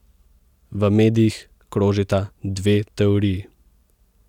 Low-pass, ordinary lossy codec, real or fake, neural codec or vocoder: 19.8 kHz; none; real; none